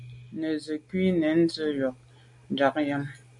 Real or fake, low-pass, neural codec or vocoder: real; 10.8 kHz; none